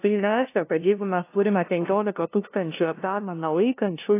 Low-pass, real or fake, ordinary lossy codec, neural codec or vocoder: 3.6 kHz; fake; AAC, 24 kbps; codec, 16 kHz, 0.5 kbps, FunCodec, trained on LibriTTS, 25 frames a second